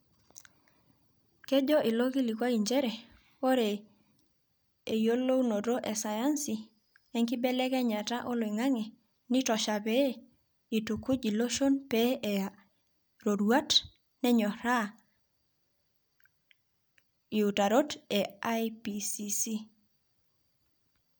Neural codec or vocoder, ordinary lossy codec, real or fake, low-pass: none; none; real; none